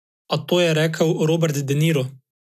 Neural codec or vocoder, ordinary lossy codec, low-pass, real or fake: none; none; 14.4 kHz; real